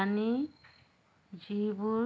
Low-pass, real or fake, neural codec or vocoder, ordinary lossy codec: none; real; none; none